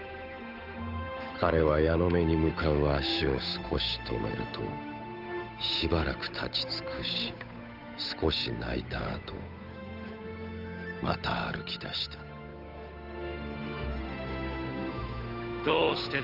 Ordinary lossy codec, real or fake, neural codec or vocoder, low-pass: none; fake; codec, 16 kHz, 8 kbps, FunCodec, trained on Chinese and English, 25 frames a second; 5.4 kHz